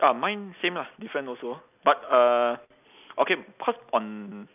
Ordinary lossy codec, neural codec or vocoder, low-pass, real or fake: none; none; 3.6 kHz; real